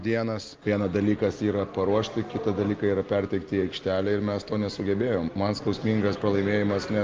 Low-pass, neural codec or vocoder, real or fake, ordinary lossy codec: 7.2 kHz; none; real; Opus, 32 kbps